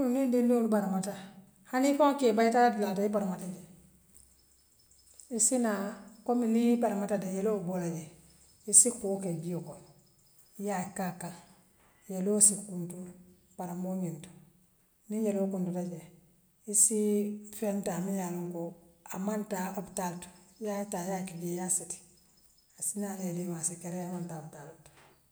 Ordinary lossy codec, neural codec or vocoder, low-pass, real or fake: none; none; none; real